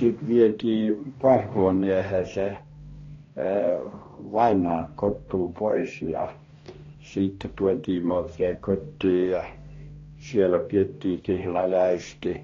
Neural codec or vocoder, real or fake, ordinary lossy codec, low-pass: codec, 16 kHz, 1 kbps, X-Codec, HuBERT features, trained on balanced general audio; fake; AAC, 32 kbps; 7.2 kHz